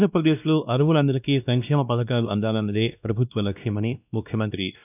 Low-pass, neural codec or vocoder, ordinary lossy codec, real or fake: 3.6 kHz; codec, 16 kHz, 1 kbps, X-Codec, WavLM features, trained on Multilingual LibriSpeech; none; fake